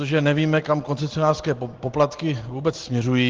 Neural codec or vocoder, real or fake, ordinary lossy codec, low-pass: none; real; Opus, 16 kbps; 7.2 kHz